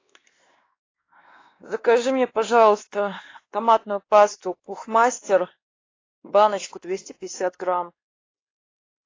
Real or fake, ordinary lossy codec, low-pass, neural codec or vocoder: fake; AAC, 32 kbps; 7.2 kHz; codec, 16 kHz, 2 kbps, X-Codec, WavLM features, trained on Multilingual LibriSpeech